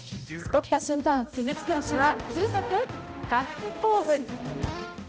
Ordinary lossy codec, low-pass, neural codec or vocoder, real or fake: none; none; codec, 16 kHz, 0.5 kbps, X-Codec, HuBERT features, trained on balanced general audio; fake